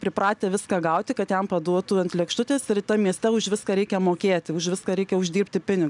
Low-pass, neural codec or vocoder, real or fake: 10.8 kHz; none; real